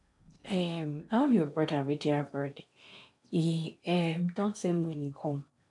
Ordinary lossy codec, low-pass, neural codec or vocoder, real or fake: none; 10.8 kHz; codec, 16 kHz in and 24 kHz out, 0.8 kbps, FocalCodec, streaming, 65536 codes; fake